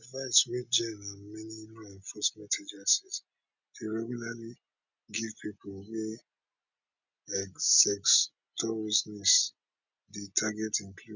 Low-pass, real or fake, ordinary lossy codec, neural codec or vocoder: 7.2 kHz; real; none; none